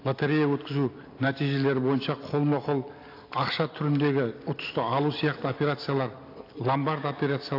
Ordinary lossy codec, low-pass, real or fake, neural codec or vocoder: AAC, 32 kbps; 5.4 kHz; real; none